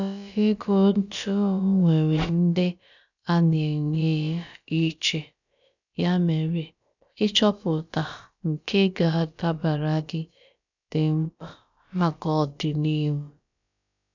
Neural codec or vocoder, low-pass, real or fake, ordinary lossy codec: codec, 16 kHz, about 1 kbps, DyCAST, with the encoder's durations; 7.2 kHz; fake; none